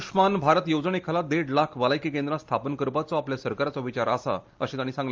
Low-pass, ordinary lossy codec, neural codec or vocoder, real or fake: 7.2 kHz; Opus, 24 kbps; none; real